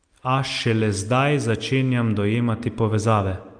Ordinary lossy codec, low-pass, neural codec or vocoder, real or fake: Opus, 32 kbps; 9.9 kHz; none; real